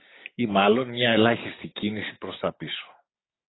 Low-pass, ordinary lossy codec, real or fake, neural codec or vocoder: 7.2 kHz; AAC, 16 kbps; fake; codec, 16 kHz, 6 kbps, DAC